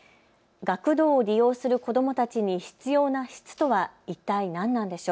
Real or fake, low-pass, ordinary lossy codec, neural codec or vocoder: real; none; none; none